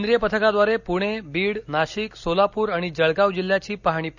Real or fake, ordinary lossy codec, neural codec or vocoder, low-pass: real; none; none; 7.2 kHz